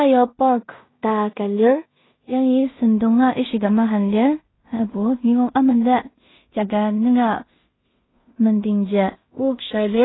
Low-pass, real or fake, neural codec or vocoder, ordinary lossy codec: 7.2 kHz; fake; codec, 16 kHz in and 24 kHz out, 0.4 kbps, LongCat-Audio-Codec, two codebook decoder; AAC, 16 kbps